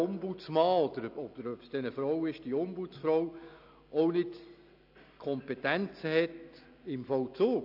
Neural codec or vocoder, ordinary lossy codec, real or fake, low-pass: none; AAC, 48 kbps; real; 5.4 kHz